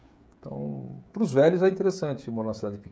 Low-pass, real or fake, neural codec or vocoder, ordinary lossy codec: none; fake; codec, 16 kHz, 16 kbps, FreqCodec, smaller model; none